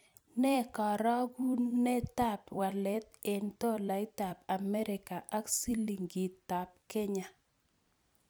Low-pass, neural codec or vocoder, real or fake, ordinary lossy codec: none; vocoder, 44.1 kHz, 128 mel bands every 256 samples, BigVGAN v2; fake; none